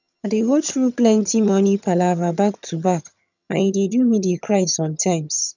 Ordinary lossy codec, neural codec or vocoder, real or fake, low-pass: none; vocoder, 22.05 kHz, 80 mel bands, HiFi-GAN; fake; 7.2 kHz